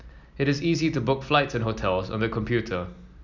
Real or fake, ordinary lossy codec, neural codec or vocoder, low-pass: real; none; none; 7.2 kHz